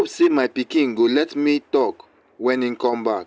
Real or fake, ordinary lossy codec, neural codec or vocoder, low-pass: real; none; none; none